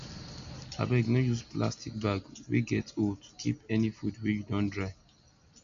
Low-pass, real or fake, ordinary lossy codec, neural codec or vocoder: 7.2 kHz; real; none; none